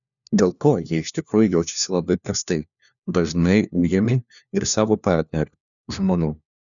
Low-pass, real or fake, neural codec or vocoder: 7.2 kHz; fake; codec, 16 kHz, 1 kbps, FunCodec, trained on LibriTTS, 50 frames a second